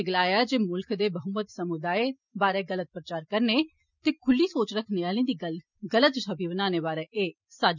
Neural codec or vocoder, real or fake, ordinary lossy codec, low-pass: none; real; none; 7.2 kHz